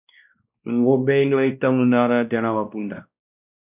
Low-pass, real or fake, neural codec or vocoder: 3.6 kHz; fake; codec, 16 kHz, 1 kbps, X-Codec, WavLM features, trained on Multilingual LibriSpeech